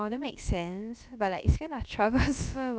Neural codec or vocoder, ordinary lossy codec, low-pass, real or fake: codec, 16 kHz, about 1 kbps, DyCAST, with the encoder's durations; none; none; fake